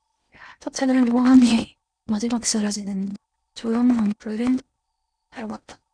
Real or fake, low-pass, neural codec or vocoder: fake; 9.9 kHz; codec, 16 kHz in and 24 kHz out, 0.8 kbps, FocalCodec, streaming, 65536 codes